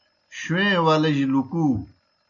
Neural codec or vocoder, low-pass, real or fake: none; 7.2 kHz; real